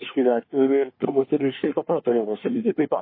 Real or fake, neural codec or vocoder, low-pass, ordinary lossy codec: fake; codec, 24 kHz, 1 kbps, SNAC; 5.4 kHz; MP3, 24 kbps